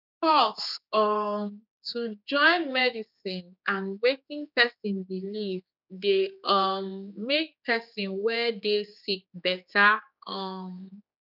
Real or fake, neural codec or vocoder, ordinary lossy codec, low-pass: fake; codec, 16 kHz, 4 kbps, X-Codec, HuBERT features, trained on general audio; none; 5.4 kHz